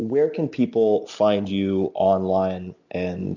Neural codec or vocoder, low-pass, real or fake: none; 7.2 kHz; real